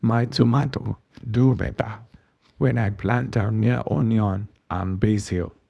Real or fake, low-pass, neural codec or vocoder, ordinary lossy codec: fake; none; codec, 24 kHz, 0.9 kbps, WavTokenizer, small release; none